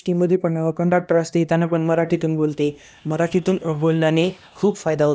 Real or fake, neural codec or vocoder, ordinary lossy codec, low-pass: fake; codec, 16 kHz, 1 kbps, X-Codec, HuBERT features, trained on LibriSpeech; none; none